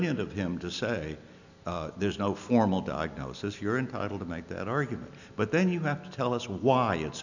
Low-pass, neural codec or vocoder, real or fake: 7.2 kHz; none; real